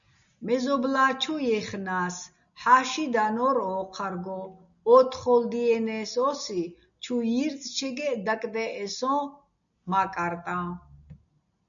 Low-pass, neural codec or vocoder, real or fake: 7.2 kHz; none; real